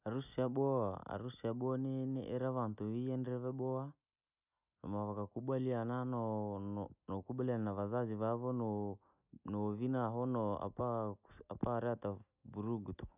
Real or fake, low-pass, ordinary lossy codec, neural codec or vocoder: real; 3.6 kHz; none; none